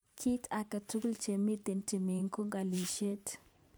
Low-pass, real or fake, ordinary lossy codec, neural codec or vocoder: none; fake; none; vocoder, 44.1 kHz, 128 mel bands every 512 samples, BigVGAN v2